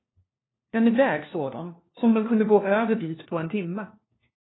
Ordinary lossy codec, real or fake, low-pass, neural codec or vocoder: AAC, 16 kbps; fake; 7.2 kHz; codec, 16 kHz, 1 kbps, FunCodec, trained on LibriTTS, 50 frames a second